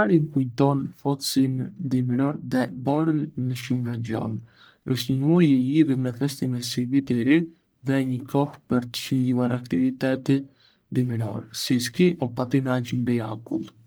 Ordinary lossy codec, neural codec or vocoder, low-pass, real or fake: none; codec, 44.1 kHz, 1.7 kbps, Pupu-Codec; none; fake